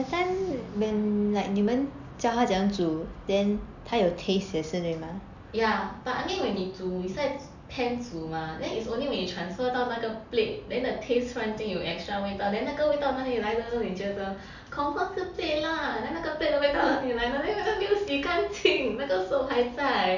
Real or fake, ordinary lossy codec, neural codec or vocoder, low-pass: real; none; none; 7.2 kHz